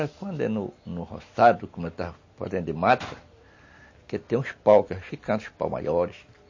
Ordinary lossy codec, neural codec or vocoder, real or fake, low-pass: MP3, 32 kbps; none; real; 7.2 kHz